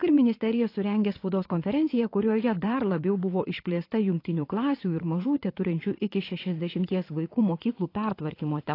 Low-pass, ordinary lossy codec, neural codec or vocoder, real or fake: 5.4 kHz; AAC, 32 kbps; vocoder, 22.05 kHz, 80 mel bands, WaveNeXt; fake